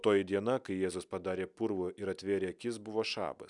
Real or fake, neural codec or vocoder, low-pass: real; none; 10.8 kHz